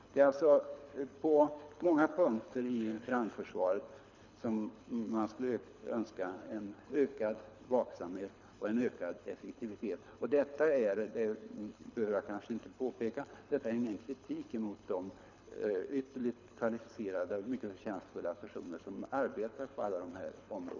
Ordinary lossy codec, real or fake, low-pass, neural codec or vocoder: none; fake; 7.2 kHz; codec, 24 kHz, 6 kbps, HILCodec